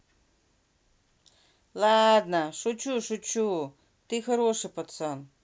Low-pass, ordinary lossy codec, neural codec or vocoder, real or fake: none; none; none; real